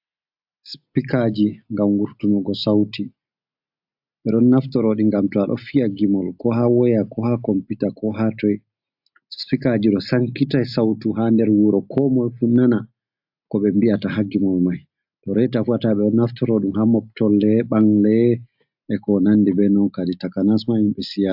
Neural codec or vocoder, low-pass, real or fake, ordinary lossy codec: none; 5.4 kHz; real; AAC, 48 kbps